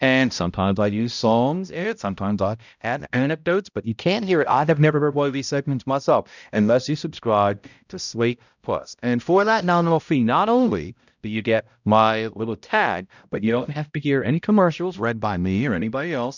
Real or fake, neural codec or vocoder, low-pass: fake; codec, 16 kHz, 0.5 kbps, X-Codec, HuBERT features, trained on balanced general audio; 7.2 kHz